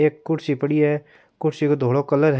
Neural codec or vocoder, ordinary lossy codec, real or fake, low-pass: none; none; real; none